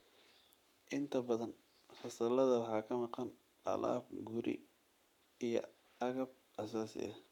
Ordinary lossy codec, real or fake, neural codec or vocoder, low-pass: none; fake; codec, 44.1 kHz, 7.8 kbps, Pupu-Codec; 19.8 kHz